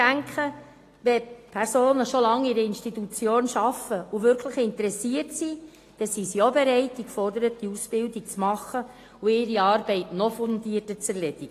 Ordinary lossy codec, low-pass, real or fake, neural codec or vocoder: AAC, 48 kbps; 14.4 kHz; real; none